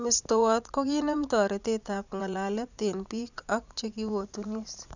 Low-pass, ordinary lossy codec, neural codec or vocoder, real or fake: 7.2 kHz; none; vocoder, 44.1 kHz, 80 mel bands, Vocos; fake